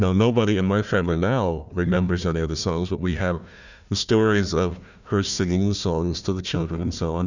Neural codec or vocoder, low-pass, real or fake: codec, 16 kHz, 1 kbps, FunCodec, trained on Chinese and English, 50 frames a second; 7.2 kHz; fake